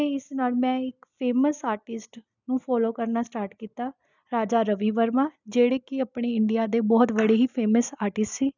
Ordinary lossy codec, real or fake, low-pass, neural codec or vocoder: none; real; 7.2 kHz; none